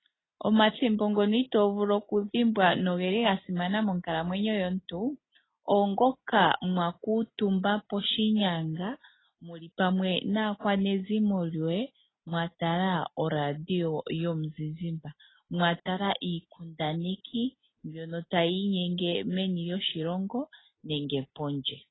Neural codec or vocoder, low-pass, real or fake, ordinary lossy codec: none; 7.2 kHz; real; AAC, 16 kbps